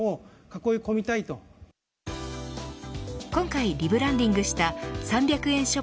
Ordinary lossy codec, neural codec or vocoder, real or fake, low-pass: none; none; real; none